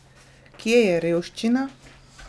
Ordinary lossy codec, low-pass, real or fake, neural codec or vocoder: none; none; real; none